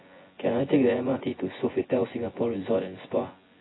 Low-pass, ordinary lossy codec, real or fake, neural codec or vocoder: 7.2 kHz; AAC, 16 kbps; fake; vocoder, 24 kHz, 100 mel bands, Vocos